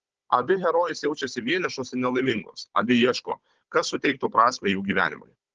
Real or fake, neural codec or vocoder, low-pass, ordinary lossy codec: fake; codec, 16 kHz, 16 kbps, FunCodec, trained on Chinese and English, 50 frames a second; 7.2 kHz; Opus, 16 kbps